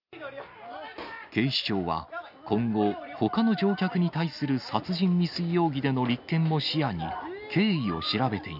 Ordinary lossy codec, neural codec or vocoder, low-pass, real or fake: none; none; 5.4 kHz; real